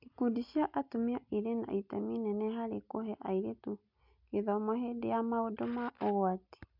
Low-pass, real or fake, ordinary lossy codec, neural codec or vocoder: 5.4 kHz; real; MP3, 48 kbps; none